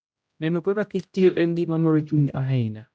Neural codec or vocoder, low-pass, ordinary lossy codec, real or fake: codec, 16 kHz, 0.5 kbps, X-Codec, HuBERT features, trained on general audio; none; none; fake